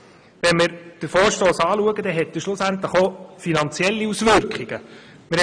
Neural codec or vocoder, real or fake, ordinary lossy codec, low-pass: none; real; none; 9.9 kHz